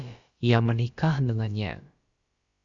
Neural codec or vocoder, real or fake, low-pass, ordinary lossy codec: codec, 16 kHz, about 1 kbps, DyCAST, with the encoder's durations; fake; 7.2 kHz; Opus, 64 kbps